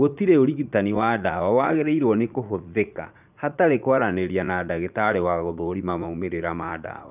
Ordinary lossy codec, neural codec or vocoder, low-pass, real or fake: none; vocoder, 44.1 kHz, 80 mel bands, Vocos; 3.6 kHz; fake